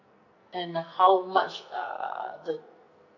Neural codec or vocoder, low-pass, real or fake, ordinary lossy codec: codec, 44.1 kHz, 2.6 kbps, SNAC; 7.2 kHz; fake; none